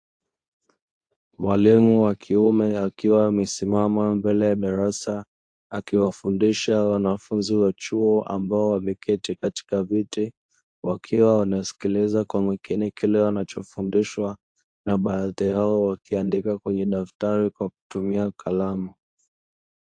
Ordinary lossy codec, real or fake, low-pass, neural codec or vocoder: AAC, 64 kbps; fake; 9.9 kHz; codec, 24 kHz, 0.9 kbps, WavTokenizer, medium speech release version 2